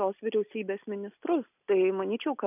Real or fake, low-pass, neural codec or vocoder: real; 3.6 kHz; none